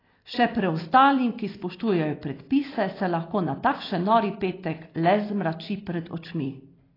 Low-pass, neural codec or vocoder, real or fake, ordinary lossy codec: 5.4 kHz; none; real; AAC, 24 kbps